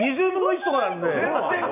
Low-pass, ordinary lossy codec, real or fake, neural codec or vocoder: 3.6 kHz; none; real; none